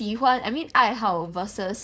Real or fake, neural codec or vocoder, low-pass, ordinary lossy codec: fake; codec, 16 kHz, 4.8 kbps, FACodec; none; none